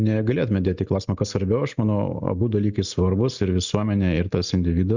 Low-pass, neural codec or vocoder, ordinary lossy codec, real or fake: 7.2 kHz; none; Opus, 64 kbps; real